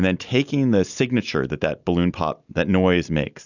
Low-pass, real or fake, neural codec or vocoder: 7.2 kHz; real; none